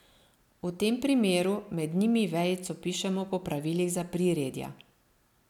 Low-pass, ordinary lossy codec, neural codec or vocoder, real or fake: 19.8 kHz; none; none; real